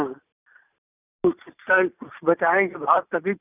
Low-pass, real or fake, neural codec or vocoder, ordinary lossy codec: 3.6 kHz; real; none; none